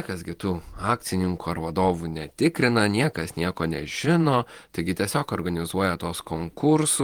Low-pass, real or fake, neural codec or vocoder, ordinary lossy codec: 19.8 kHz; fake; vocoder, 48 kHz, 128 mel bands, Vocos; Opus, 24 kbps